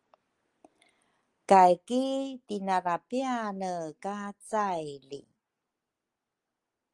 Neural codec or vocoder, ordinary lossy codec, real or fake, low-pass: none; Opus, 24 kbps; real; 10.8 kHz